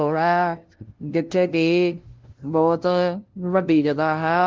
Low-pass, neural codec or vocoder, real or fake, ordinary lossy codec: 7.2 kHz; codec, 16 kHz, 0.5 kbps, FunCodec, trained on LibriTTS, 25 frames a second; fake; Opus, 16 kbps